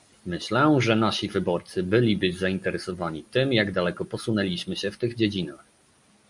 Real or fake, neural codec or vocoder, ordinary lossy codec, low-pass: real; none; MP3, 96 kbps; 10.8 kHz